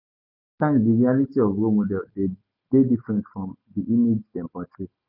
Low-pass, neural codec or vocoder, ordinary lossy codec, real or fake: 5.4 kHz; none; none; real